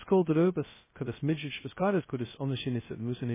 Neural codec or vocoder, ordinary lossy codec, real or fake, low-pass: codec, 16 kHz, 0.2 kbps, FocalCodec; MP3, 16 kbps; fake; 3.6 kHz